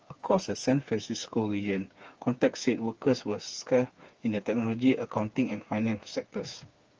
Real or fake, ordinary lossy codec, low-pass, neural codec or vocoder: fake; Opus, 24 kbps; 7.2 kHz; codec, 16 kHz, 4 kbps, FreqCodec, smaller model